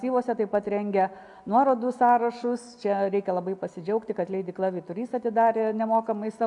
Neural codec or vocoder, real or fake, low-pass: none; real; 10.8 kHz